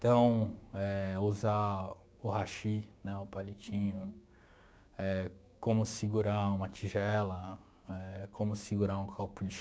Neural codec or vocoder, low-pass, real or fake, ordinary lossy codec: codec, 16 kHz, 6 kbps, DAC; none; fake; none